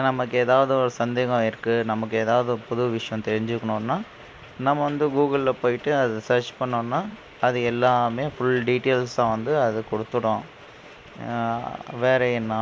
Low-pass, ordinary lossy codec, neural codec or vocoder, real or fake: none; none; none; real